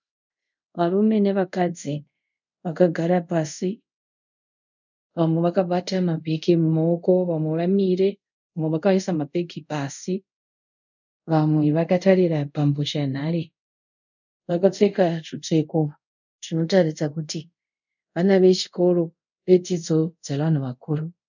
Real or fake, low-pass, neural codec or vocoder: fake; 7.2 kHz; codec, 24 kHz, 0.5 kbps, DualCodec